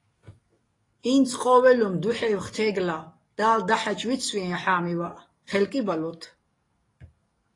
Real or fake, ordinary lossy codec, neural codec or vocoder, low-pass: fake; AAC, 32 kbps; codec, 44.1 kHz, 7.8 kbps, DAC; 10.8 kHz